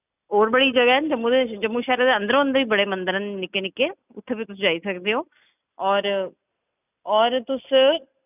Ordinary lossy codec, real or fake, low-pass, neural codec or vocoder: none; real; 3.6 kHz; none